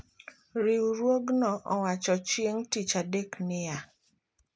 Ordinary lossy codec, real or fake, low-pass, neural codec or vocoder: none; real; none; none